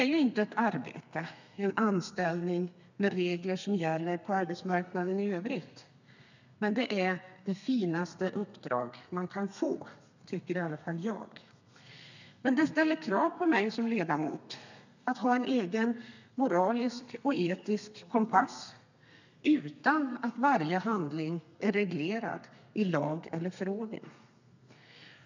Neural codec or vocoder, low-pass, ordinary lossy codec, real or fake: codec, 32 kHz, 1.9 kbps, SNAC; 7.2 kHz; none; fake